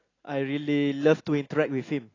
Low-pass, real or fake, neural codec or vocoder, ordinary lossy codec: 7.2 kHz; real; none; AAC, 32 kbps